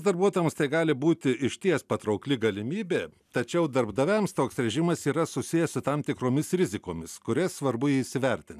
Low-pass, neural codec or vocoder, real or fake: 14.4 kHz; none; real